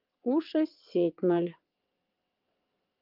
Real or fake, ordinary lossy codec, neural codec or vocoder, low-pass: fake; Opus, 24 kbps; vocoder, 22.05 kHz, 80 mel bands, WaveNeXt; 5.4 kHz